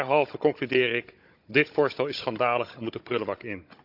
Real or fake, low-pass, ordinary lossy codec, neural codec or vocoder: fake; 5.4 kHz; none; codec, 16 kHz, 16 kbps, FunCodec, trained on Chinese and English, 50 frames a second